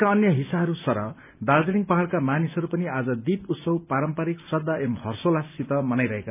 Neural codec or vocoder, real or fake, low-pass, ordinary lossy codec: none; real; 3.6 kHz; none